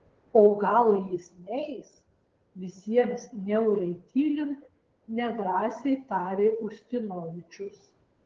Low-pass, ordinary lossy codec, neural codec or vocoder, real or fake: 7.2 kHz; Opus, 16 kbps; codec, 16 kHz, 2 kbps, FunCodec, trained on Chinese and English, 25 frames a second; fake